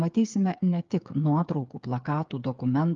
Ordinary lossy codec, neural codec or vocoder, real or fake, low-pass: Opus, 32 kbps; codec, 16 kHz, 8 kbps, FreqCodec, smaller model; fake; 7.2 kHz